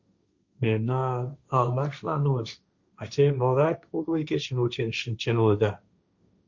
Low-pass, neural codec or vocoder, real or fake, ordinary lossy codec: 7.2 kHz; codec, 16 kHz, 1.1 kbps, Voila-Tokenizer; fake; Opus, 64 kbps